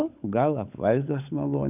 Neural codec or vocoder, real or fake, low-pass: codec, 16 kHz, 16 kbps, FunCodec, trained on LibriTTS, 50 frames a second; fake; 3.6 kHz